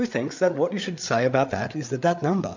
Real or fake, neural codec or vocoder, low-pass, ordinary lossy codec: fake; codec, 16 kHz, 8 kbps, FreqCodec, larger model; 7.2 kHz; MP3, 64 kbps